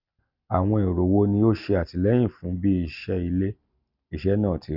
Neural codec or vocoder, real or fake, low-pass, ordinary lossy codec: none; real; 5.4 kHz; none